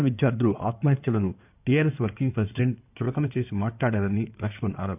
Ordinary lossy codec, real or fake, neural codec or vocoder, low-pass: none; fake; codec, 16 kHz in and 24 kHz out, 2.2 kbps, FireRedTTS-2 codec; 3.6 kHz